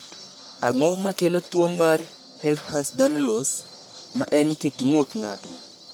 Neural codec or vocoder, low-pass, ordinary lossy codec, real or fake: codec, 44.1 kHz, 1.7 kbps, Pupu-Codec; none; none; fake